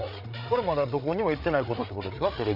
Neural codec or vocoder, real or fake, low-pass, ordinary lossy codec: codec, 16 kHz, 8 kbps, FreqCodec, larger model; fake; 5.4 kHz; none